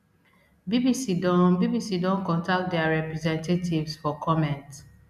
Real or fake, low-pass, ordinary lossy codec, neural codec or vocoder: real; 14.4 kHz; none; none